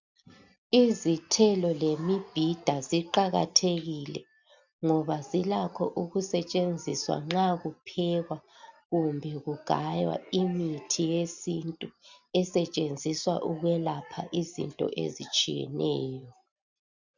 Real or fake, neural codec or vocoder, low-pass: real; none; 7.2 kHz